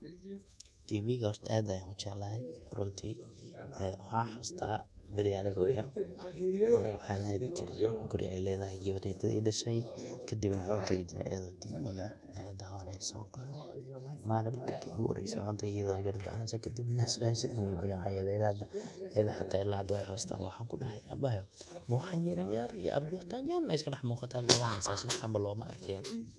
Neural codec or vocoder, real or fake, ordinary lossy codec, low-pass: codec, 24 kHz, 1.2 kbps, DualCodec; fake; none; none